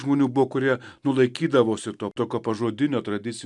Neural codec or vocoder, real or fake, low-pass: none; real; 10.8 kHz